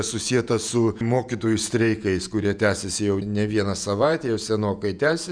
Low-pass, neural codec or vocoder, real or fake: 9.9 kHz; vocoder, 48 kHz, 128 mel bands, Vocos; fake